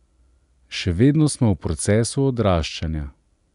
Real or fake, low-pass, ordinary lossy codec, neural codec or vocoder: real; 10.8 kHz; none; none